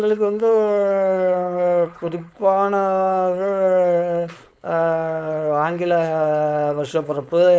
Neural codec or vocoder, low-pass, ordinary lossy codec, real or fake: codec, 16 kHz, 4.8 kbps, FACodec; none; none; fake